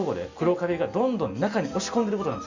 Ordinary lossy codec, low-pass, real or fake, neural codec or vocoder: Opus, 64 kbps; 7.2 kHz; real; none